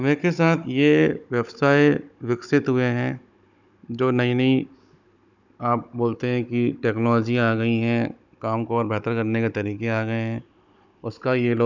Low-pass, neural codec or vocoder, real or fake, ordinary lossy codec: 7.2 kHz; codec, 16 kHz, 16 kbps, FunCodec, trained on Chinese and English, 50 frames a second; fake; none